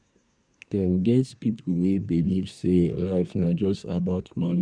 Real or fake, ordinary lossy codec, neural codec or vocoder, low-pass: fake; none; codec, 24 kHz, 1 kbps, SNAC; 9.9 kHz